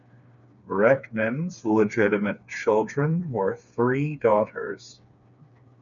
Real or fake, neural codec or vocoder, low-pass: fake; codec, 16 kHz, 4 kbps, FreqCodec, smaller model; 7.2 kHz